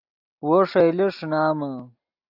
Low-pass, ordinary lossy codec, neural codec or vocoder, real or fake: 5.4 kHz; AAC, 48 kbps; none; real